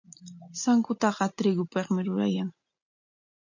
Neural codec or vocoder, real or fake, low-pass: none; real; 7.2 kHz